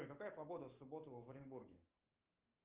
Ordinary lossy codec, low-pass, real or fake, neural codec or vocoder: Opus, 32 kbps; 3.6 kHz; fake; autoencoder, 48 kHz, 128 numbers a frame, DAC-VAE, trained on Japanese speech